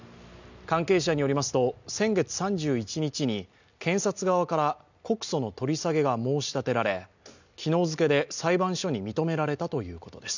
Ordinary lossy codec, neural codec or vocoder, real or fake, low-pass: none; none; real; 7.2 kHz